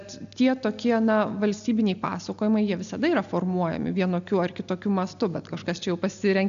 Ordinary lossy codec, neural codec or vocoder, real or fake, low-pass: AAC, 96 kbps; none; real; 7.2 kHz